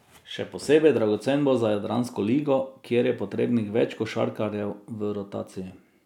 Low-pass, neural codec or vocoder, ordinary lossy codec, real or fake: 19.8 kHz; none; none; real